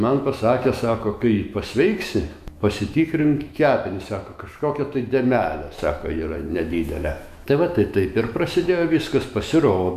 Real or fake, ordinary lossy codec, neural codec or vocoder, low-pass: fake; MP3, 96 kbps; autoencoder, 48 kHz, 128 numbers a frame, DAC-VAE, trained on Japanese speech; 14.4 kHz